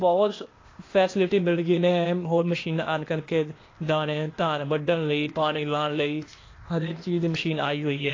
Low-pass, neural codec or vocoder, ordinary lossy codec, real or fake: 7.2 kHz; codec, 16 kHz, 0.8 kbps, ZipCodec; AAC, 32 kbps; fake